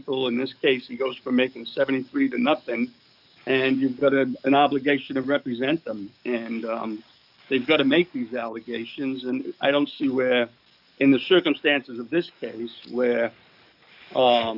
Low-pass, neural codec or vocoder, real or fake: 5.4 kHz; codec, 44.1 kHz, 7.8 kbps, DAC; fake